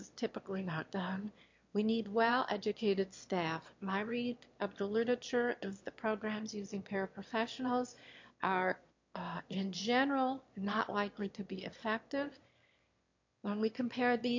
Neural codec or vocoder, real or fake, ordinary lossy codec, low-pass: autoencoder, 22.05 kHz, a latent of 192 numbers a frame, VITS, trained on one speaker; fake; MP3, 48 kbps; 7.2 kHz